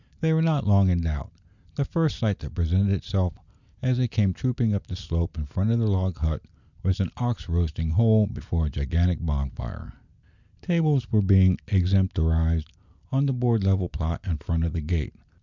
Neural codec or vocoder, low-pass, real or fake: none; 7.2 kHz; real